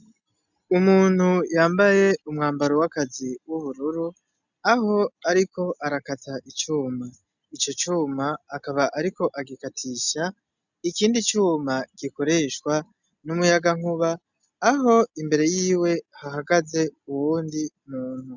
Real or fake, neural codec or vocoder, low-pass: real; none; 7.2 kHz